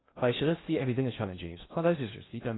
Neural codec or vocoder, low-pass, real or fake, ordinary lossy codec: codec, 16 kHz in and 24 kHz out, 0.6 kbps, FocalCodec, streaming, 2048 codes; 7.2 kHz; fake; AAC, 16 kbps